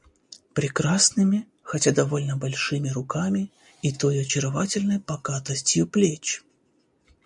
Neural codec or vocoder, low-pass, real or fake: none; 10.8 kHz; real